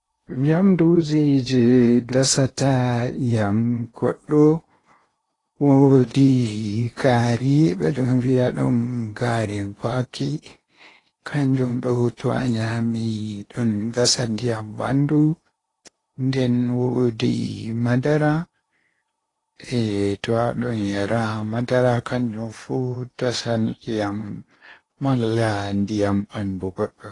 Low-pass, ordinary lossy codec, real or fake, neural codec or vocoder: 10.8 kHz; AAC, 32 kbps; fake; codec, 16 kHz in and 24 kHz out, 0.8 kbps, FocalCodec, streaming, 65536 codes